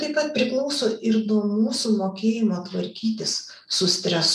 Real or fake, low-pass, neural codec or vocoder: real; 14.4 kHz; none